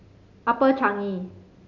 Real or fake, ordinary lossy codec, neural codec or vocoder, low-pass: real; MP3, 64 kbps; none; 7.2 kHz